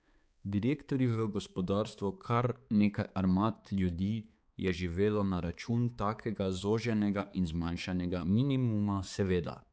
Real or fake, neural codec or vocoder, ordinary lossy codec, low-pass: fake; codec, 16 kHz, 4 kbps, X-Codec, HuBERT features, trained on balanced general audio; none; none